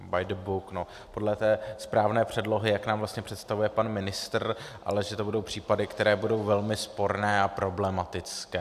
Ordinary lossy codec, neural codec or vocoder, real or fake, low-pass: AAC, 96 kbps; none; real; 14.4 kHz